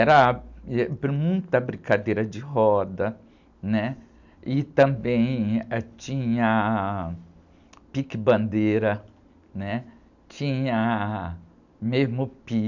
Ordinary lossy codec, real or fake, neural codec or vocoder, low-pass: none; real; none; 7.2 kHz